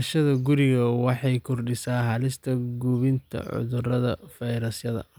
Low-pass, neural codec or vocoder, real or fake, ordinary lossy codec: none; none; real; none